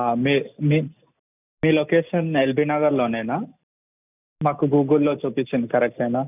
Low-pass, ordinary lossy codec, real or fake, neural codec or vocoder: 3.6 kHz; none; real; none